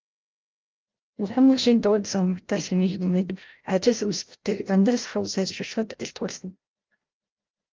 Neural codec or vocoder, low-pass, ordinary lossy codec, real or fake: codec, 16 kHz, 0.5 kbps, FreqCodec, larger model; 7.2 kHz; Opus, 32 kbps; fake